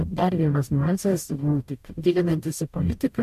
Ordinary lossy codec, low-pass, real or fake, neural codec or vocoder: MP3, 64 kbps; 14.4 kHz; fake; codec, 44.1 kHz, 0.9 kbps, DAC